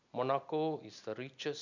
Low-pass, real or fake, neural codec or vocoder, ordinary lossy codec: 7.2 kHz; real; none; none